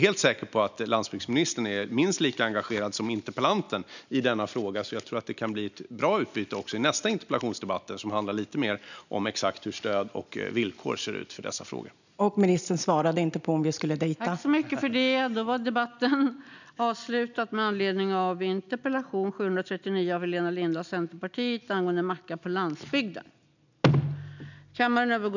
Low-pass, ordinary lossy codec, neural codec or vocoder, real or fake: 7.2 kHz; none; none; real